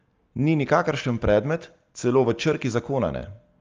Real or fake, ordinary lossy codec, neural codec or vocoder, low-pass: real; Opus, 24 kbps; none; 7.2 kHz